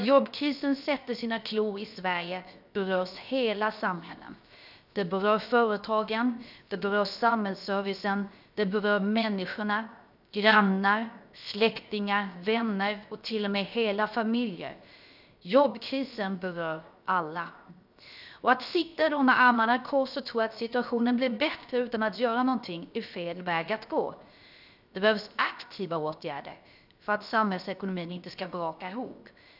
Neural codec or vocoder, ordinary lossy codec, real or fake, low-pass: codec, 16 kHz, 0.7 kbps, FocalCodec; none; fake; 5.4 kHz